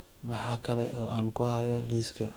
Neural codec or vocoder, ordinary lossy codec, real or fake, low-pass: codec, 44.1 kHz, 2.6 kbps, DAC; none; fake; none